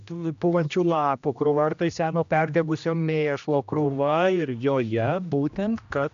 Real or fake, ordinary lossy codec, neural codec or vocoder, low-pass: fake; AAC, 96 kbps; codec, 16 kHz, 1 kbps, X-Codec, HuBERT features, trained on general audio; 7.2 kHz